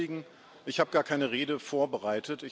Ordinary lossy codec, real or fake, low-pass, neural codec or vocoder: none; real; none; none